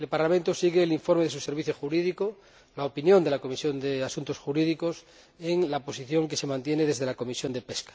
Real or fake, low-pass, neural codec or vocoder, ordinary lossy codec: real; none; none; none